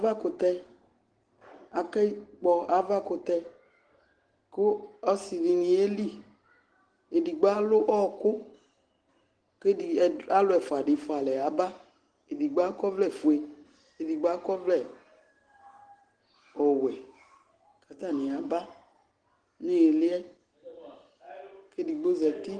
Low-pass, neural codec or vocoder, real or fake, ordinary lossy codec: 9.9 kHz; none; real; Opus, 16 kbps